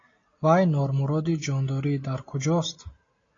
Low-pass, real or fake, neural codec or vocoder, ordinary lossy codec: 7.2 kHz; real; none; AAC, 32 kbps